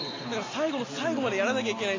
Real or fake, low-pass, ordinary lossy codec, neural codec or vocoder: real; 7.2 kHz; AAC, 32 kbps; none